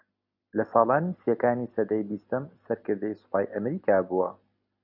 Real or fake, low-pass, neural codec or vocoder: real; 5.4 kHz; none